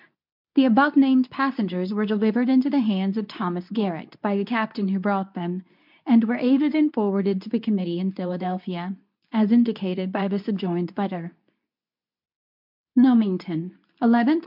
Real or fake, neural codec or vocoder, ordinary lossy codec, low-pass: fake; codec, 24 kHz, 0.9 kbps, WavTokenizer, medium speech release version 2; MP3, 48 kbps; 5.4 kHz